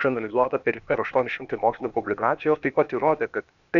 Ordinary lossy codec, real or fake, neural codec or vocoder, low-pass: MP3, 96 kbps; fake; codec, 16 kHz, 0.8 kbps, ZipCodec; 7.2 kHz